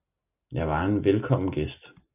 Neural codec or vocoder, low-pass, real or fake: none; 3.6 kHz; real